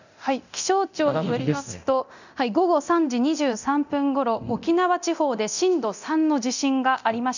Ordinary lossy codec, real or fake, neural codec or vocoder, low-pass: none; fake; codec, 24 kHz, 0.9 kbps, DualCodec; 7.2 kHz